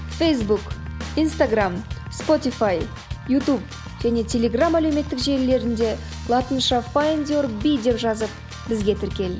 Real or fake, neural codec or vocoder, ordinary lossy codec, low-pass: real; none; none; none